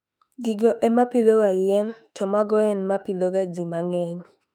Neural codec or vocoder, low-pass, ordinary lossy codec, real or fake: autoencoder, 48 kHz, 32 numbers a frame, DAC-VAE, trained on Japanese speech; 19.8 kHz; none; fake